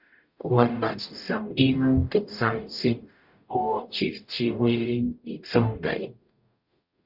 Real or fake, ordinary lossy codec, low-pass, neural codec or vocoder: fake; Opus, 64 kbps; 5.4 kHz; codec, 44.1 kHz, 0.9 kbps, DAC